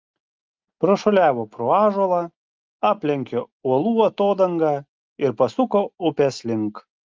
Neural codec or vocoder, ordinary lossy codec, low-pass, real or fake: none; Opus, 32 kbps; 7.2 kHz; real